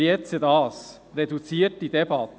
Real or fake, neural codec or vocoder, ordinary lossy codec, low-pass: real; none; none; none